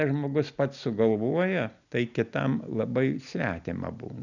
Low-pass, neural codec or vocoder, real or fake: 7.2 kHz; none; real